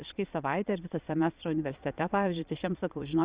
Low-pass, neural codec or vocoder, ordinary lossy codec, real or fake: 3.6 kHz; vocoder, 22.05 kHz, 80 mel bands, Vocos; Opus, 64 kbps; fake